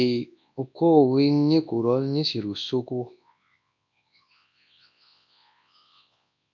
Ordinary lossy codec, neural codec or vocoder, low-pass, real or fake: MP3, 64 kbps; codec, 24 kHz, 0.9 kbps, WavTokenizer, large speech release; 7.2 kHz; fake